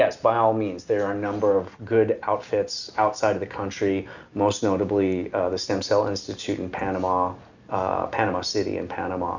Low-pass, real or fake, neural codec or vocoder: 7.2 kHz; real; none